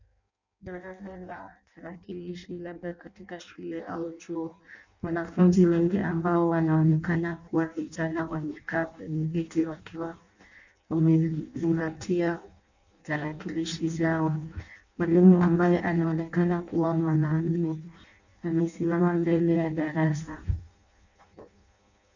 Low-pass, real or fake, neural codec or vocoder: 7.2 kHz; fake; codec, 16 kHz in and 24 kHz out, 0.6 kbps, FireRedTTS-2 codec